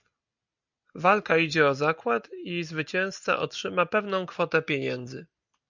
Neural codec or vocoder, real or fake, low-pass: none; real; 7.2 kHz